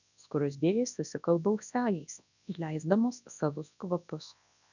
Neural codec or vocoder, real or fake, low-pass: codec, 24 kHz, 0.9 kbps, WavTokenizer, large speech release; fake; 7.2 kHz